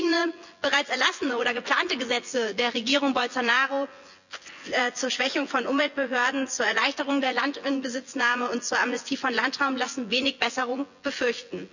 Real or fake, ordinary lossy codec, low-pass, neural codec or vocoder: fake; none; 7.2 kHz; vocoder, 24 kHz, 100 mel bands, Vocos